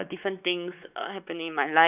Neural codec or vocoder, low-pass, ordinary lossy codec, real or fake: codec, 16 kHz, 4 kbps, X-Codec, WavLM features, trained on Multilingual LibriSpeech; 3.6 kHz; none; fake